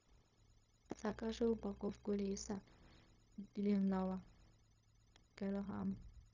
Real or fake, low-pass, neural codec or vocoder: fake; 7.2 kHz; codec, 16 kHz, 0.4 kbps, LongCat-Audio-Codec